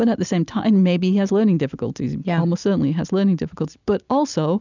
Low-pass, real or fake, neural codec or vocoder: 7.2 kHz; real; none